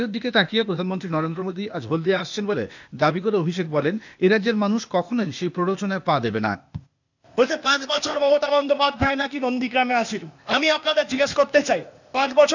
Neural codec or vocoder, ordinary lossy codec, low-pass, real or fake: codec, 16 kHz, 0.8 kbps, ZipCodec; none; 7.2 kHz; fake